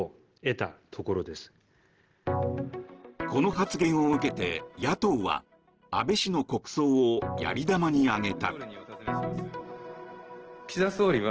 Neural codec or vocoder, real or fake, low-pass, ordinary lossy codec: none; real; 7.2 kHz; Opus, 16 kbps